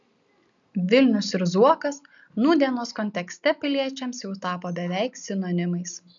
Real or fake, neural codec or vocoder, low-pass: real; none; 7.2 kHz